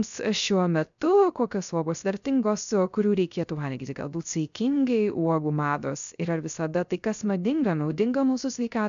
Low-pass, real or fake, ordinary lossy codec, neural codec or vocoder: 7.2 kHz; fake; MP3, 96 kbps; codec, 16 kHz, 0.3 kbps, FocalCodec